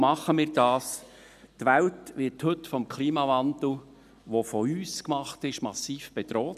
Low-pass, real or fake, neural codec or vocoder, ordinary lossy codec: 14.4 kHz; real; none; none